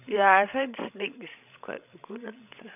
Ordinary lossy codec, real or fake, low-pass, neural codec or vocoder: none; fake; 3.6 kHz; codec, 16 kHz, 16 kbps, FreqCodec, larger model